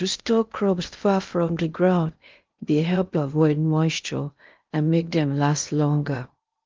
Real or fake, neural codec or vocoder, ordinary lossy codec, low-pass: fake; codec, 16 kHz in and 24 kHz out, 0.6 kbps, FocalCodec, streaming, 2048 codes; Opus, 32 kbps; 7.2 kHz